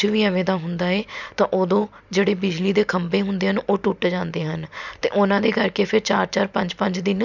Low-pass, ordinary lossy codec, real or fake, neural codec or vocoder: 7.2 kHz; none; real; none